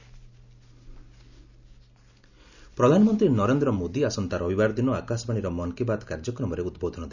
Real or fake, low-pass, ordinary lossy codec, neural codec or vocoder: real; 7.2 kHz; none; none